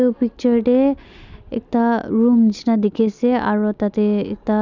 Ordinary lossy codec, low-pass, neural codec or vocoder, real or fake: none; 7.2 kHz; none; real